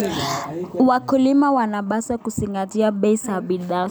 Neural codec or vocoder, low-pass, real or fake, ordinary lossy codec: none; none; real; none